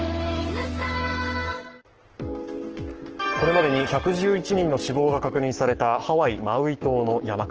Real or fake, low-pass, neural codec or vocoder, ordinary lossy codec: fake; 7.2 kHz; codec, 44.1 kHz, 7.8 kbps, Pupu-Codec; Opus, 16 kbps